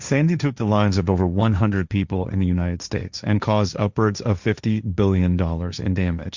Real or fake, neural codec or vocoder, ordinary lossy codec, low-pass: fake; codec, 16 kHz, 1.1 kbps, Voila-Tokenizer; Opus, 64 kbps; 7.2 kHz